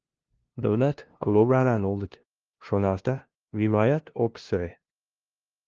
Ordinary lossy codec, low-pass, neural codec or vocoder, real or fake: Opus, 16 kbps; 7.2 kHz; codec, 16 kHz, 0.5 kbps, FunCodec, trained on LibriTTS, 25 frames a second; fake